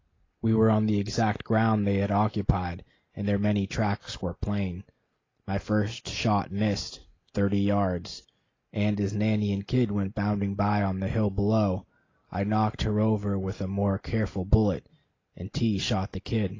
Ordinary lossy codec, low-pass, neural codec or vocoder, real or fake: AAC, 32 kbps; 7.2 kHz; none; real